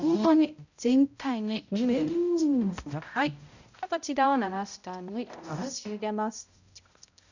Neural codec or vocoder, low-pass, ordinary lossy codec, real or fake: codec, 16 kHz, 0.5 kbps, X-Codec, HuBERT features, trained on balanced general audio; 7.2 kHz; none; fake